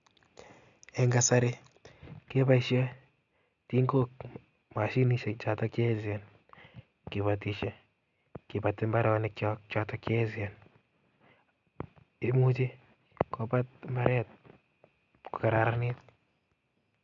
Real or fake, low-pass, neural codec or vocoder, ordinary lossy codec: real; 7.2 kHz; none; none